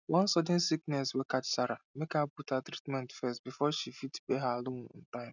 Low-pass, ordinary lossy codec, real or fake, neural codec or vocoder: 7.2 kHz; none; real; none